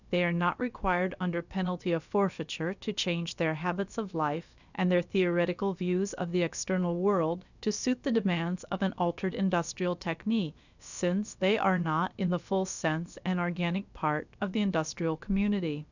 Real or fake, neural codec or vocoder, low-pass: fake; codec, 16 kHz, about 1 kbps, DyCAST, with the encoder's durations; 7.2 kHz